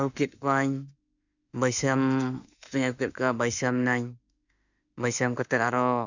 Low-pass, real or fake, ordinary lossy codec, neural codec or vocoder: 7.2 kHz; fake; none; autoencoder, 48 kHz, 32 numbers a frame, DAC-VAE, trained on Japanese speech